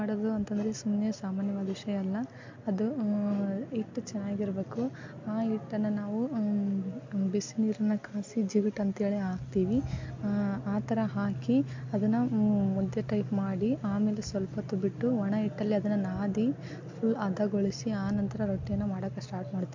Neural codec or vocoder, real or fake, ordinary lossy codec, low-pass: none; real; MP3, 48 kbps; 7.2 kHz